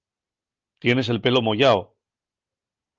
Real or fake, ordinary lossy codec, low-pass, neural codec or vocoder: real; Opus, 24 kbps; 7.2 kHz; none